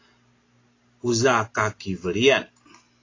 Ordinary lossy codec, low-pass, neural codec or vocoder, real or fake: AAC, 32 kbps; 7.2 kHz; none; real